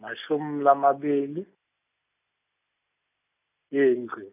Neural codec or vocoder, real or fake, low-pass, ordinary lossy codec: none; real; 3.6 kHz; none